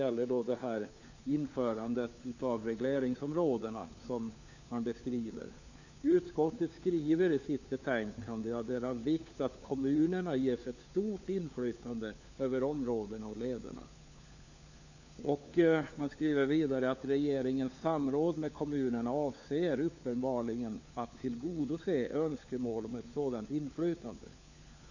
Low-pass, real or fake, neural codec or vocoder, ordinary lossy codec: 7.2 kHz; fake; codec, 16 kHz, 4 kbps, FunCodec, trained on LibriTTS, 50 frames a second; none